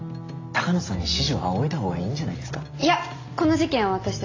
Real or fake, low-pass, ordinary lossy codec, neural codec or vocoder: real; 7.2 kHz; AAC, 32 kbps; none